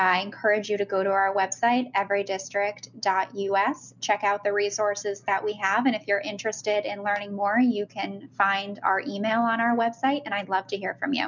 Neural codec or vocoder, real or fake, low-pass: none; real; 7.2 kHz